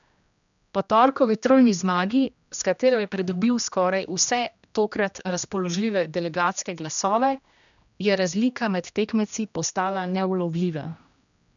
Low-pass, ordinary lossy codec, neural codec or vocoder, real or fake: 7.2 kHz; none; codec, 16 kHz, 1 kbps, X-Codec, HuBERT features, trained on general audio; fake